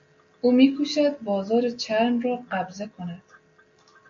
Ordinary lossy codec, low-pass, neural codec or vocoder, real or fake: MP3, 48 kbps; 7.2 kHz; none; real